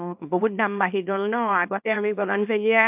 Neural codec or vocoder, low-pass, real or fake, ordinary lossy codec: codec, 24 kHz, 0.9 kbps, WavTokenizer, small release; 3.6 kHz; fake; AAC, 32 kbps